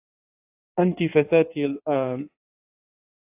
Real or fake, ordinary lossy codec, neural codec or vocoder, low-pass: fake; AAC, 32 kbps; codec, 16 kHz in and 24 kHz out, 1.1 kbps, FireRedTTS-2 codec; 3.6 kHz